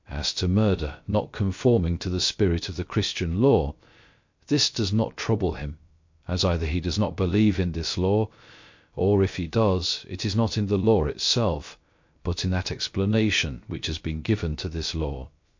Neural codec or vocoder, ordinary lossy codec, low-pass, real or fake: codec, 16 kHz, 0.3 kbps, FocalCodec; MP3, 48 kbps; 7.2 kHz; fake